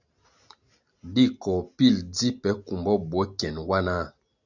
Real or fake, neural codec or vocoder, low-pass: real; none; 7.2 kHz